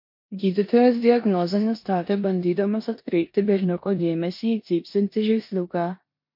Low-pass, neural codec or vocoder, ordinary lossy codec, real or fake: 5.4 kHz; codec, 16 kHz in and 24 kHz out, 0.9 kbps, LongCat-Audio-Codec, four codebook decoder; MP3, 32 kbps; fake